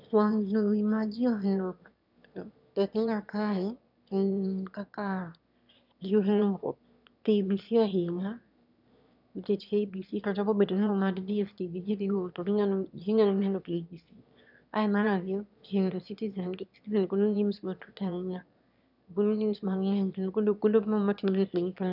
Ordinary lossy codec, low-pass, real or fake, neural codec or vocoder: none; 5.4 kHz; fake; autoencoder, 22.05 kHz, a latent of 192 numbers a frame, VITS, trained on one speaker